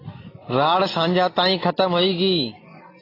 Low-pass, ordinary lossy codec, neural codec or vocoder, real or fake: 5.4 kHz; AAC, 24 kbps; none; real